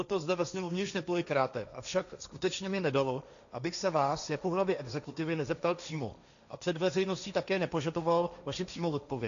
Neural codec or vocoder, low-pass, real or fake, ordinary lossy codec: codec, 16 kHz, 1.1 kbps, Voila-Tokenizer; 7.2 kHz; fake; MP3, 96 kbps